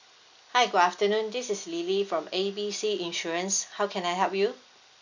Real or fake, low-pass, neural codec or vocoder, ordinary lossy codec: real; 7.2 kHz; none; none